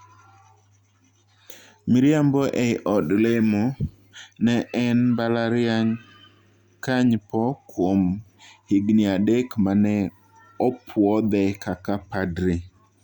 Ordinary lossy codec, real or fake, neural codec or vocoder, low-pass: Opus, 64 kbps; real; none; 19.8 kHz